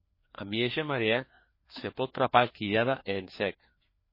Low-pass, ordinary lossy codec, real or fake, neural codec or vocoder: 5.4 kHz; MP3, 24 kbps; fake; codec, 16 kHz, 2 kbps, FreqCodec, larger model